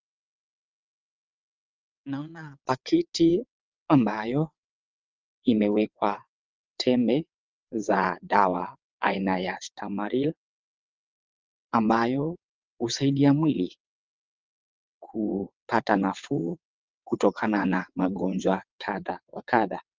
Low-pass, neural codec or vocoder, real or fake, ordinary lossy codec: 7.2 kHz; vocoder, 22.05 kHz, 80 mel bands, WaveNeXt; fake; Opus, 32 kbps